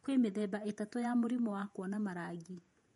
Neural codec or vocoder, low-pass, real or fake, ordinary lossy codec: vocoder, 48 kHz, 128 mel bands, Vocos; 19.8 kHz; fake; MP3, 48 kbps